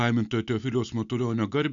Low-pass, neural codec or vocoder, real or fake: 7.2 kHz; none; real